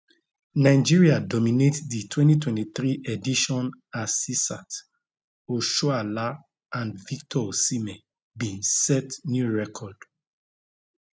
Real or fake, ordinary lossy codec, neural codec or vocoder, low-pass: real; none; none; none